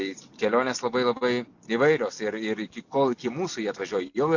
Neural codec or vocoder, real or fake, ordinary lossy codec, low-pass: none; real; AAC, 48 kbps; 7.2 kHz